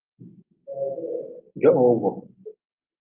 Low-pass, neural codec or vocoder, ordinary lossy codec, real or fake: 3.6 kHz; none; Opus, 24 kbps; real